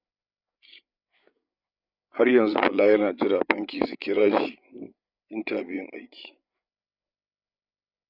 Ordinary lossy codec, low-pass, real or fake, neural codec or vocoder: none; 5.4 kHz; fake; codec, 16 kHz, 8 kbps, FreqCodec, larger model